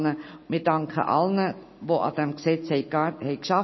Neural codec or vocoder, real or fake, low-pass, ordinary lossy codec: none; real; 7.2 kHz; MP3, 24 kbps